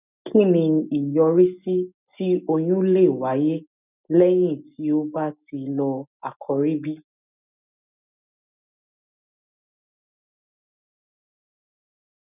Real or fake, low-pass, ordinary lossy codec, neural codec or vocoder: real; 3.6 kHz; none; none